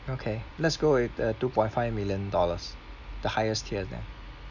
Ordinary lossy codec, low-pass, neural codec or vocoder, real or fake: none; 7.2 kHz; none; real